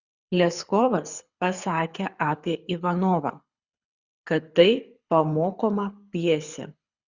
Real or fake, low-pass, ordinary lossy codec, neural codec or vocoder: fake; 7.2 kHz; Opus, 64 kbps; codec, 24 kHz, 6 kbps, HILCodec